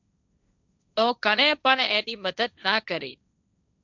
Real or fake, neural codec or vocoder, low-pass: fake; codec, 16 kHz, 1.1 kbps, Voila-Tokenizer; 7.2 kHz